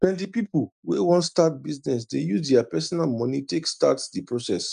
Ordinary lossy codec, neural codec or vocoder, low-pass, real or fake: none; none; 9.9 kHz; real